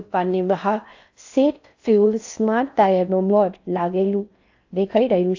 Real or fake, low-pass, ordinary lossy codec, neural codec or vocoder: fake; 7.2 kHz; AAC, 48 kbps; codec, 16 kHz in and 24 kHz out, 0.6 kbps, FocalCodec, streaming, 4096 codes